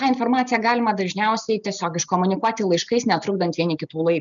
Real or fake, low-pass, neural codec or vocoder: real; 7.2 kHz; none